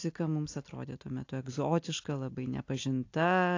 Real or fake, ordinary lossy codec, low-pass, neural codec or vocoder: real; AAC, 48 kbps; 7.2 kHz; none